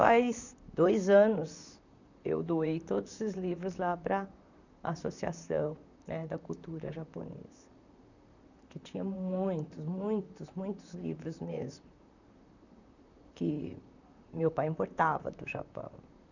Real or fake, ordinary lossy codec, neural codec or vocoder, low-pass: fake; none; vocoder, 44.1 kHz, 128 mel bands, Pupu-Vocoder; 7.2 kHz